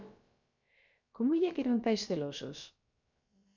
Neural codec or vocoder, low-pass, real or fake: codec, 16 kHz, about 1 kbps, DyCAST, with the encoder's durations; 7.2 kHz; fake